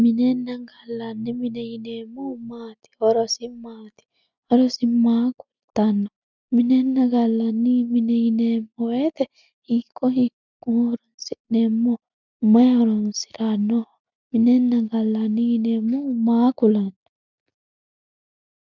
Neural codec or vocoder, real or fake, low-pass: none; real; 7.2 kHz